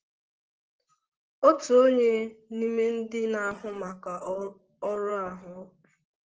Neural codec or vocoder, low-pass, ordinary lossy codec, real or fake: vocoder, 44.1 kHz, 128 mel bands, Pupu-Vocoder; 7.2 kHz; Opus, 16 kbps; fake